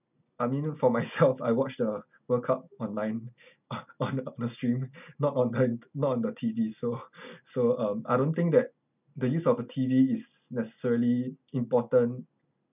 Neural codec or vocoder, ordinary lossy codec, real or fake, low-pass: none; none; real; 3.6 kHz